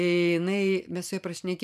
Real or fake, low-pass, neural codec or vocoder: fake; 14.4 kHz; vocoder, 44.1 kHz, 128 mel bands every 512 samples, BigVGAN v2